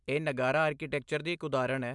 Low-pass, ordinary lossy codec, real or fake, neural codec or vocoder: 10.8 kHz; MP3, 96 kbps; real; none